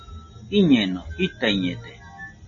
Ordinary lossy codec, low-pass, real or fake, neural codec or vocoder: MP3, 32 kbps; 7.2 kHz; real; none